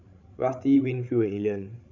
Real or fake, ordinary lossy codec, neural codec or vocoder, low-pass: fake; none; codec, 16 kHz, 16 kbps, FreqCodec, larger model; 7.2 kHz